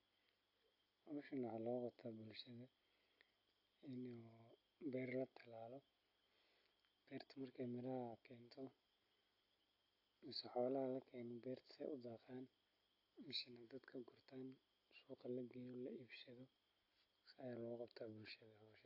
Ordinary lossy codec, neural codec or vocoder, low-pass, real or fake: AAC, 32 kbps; none; 5.4 kHz; real